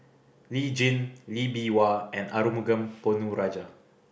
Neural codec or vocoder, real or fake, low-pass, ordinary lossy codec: none; real; none; none